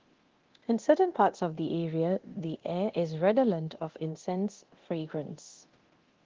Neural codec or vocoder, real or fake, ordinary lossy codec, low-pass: codec, 24 kHz, 0.9 kbps, DualCodec; fake; Opus, 16 kbps; 7.2 kHz